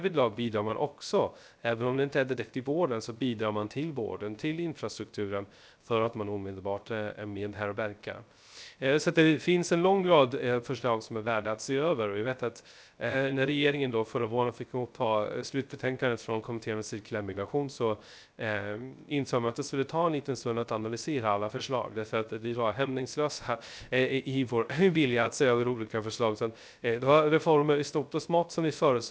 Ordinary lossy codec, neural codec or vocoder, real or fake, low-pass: none; codec, 16 kHz, 0.3 kbps, FocalCodec; fake; none